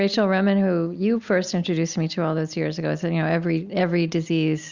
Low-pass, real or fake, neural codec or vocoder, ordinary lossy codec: 7.2 kHz; real; none; Opus, 64 kbps